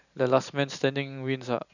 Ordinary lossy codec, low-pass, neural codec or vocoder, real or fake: none; 7.2 kHz; vocoder, 44.1 kHz, 128 mel bands every 512 samples, BigVGAN v2; fake